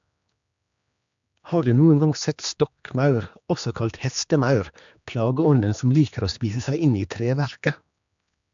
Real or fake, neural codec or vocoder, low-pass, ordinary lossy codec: fake; codec, 16 kHz, 2 kbps, X-Codec, HuBERT features, trained on general audio; 7.2 kHz; MP3, 64 kbps